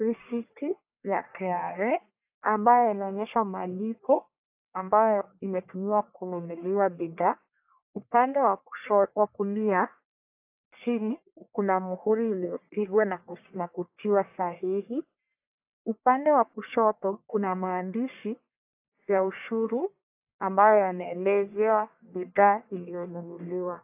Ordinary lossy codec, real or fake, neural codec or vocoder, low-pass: AAC, 32 kbps; fake; codec, 44.1 kHz, 1.7 kbps, Pupu-Codec; 3.6 kHz